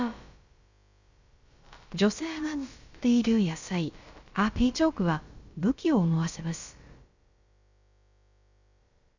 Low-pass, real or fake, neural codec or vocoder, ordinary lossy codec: 7.2 kHz; fake; codec, 16 kHz, about 1 kbps, DyCAST, with the encoder's durations; Opus, 64 kbps